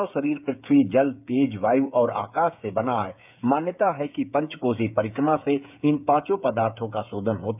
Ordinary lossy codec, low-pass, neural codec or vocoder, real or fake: none; 3.6 kHz; codec, 16 kHz, 8 kbps, FreqCodec, smaller model; fake